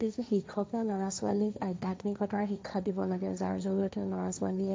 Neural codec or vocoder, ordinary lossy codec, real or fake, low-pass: codec, 16 kHz, 1.1 kbps, Voila-Tokenizer; none; fake; none